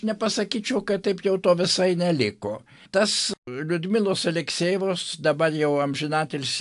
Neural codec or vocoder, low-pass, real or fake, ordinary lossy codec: none; 10.8 kHz; real; AAC, 64 kbps